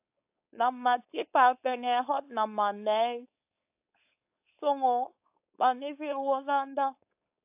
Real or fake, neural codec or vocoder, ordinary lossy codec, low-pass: fake; codec, 16 kHz, 4.8 kbps, FACodec; AAC, 32 kbps; 3.6 kHz